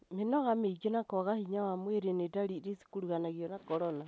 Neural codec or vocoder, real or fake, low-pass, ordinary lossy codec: none; real; none; none